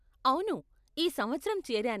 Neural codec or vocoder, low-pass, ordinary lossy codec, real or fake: none; 14.4 kHz; none; real